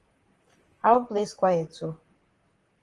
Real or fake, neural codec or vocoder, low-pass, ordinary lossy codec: fake; vocoder, 44.1 kHz, 128 mel bands, Pupu-Vocoder; 10.8 kHz; Opus, 24 kbps